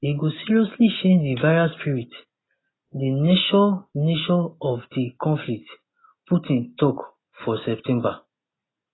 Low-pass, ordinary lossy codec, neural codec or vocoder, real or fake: 7.2 kHz; AAC, 16 kbps; none; real